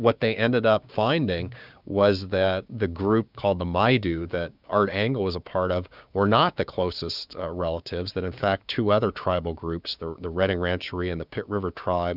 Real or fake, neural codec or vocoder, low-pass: fake; codec, 16 kHz, 6 kbps, DAC; 5.4 kHz